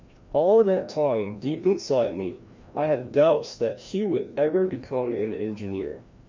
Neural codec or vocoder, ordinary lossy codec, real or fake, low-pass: codec, 16 kHz, 1 kbps, FreqCodec, larger model; MP3, 64 kbps; fake; 7.2 kHz